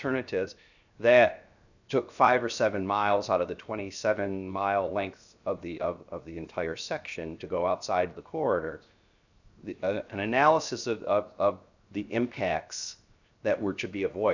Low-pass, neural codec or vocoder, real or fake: 7.2 kHz; codec, 16 kHz, 0.7 kbps, FocalCodec; fake